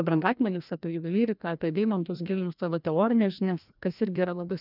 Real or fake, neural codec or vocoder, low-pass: fake; codec, 16 kHz, 1 kbps, FreqCodec, larger model; 5.4 kHz